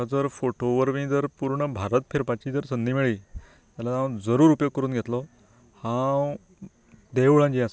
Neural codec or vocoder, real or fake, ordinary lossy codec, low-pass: none; real; none; none